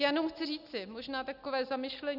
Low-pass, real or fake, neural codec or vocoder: 5.4 kHz; real; none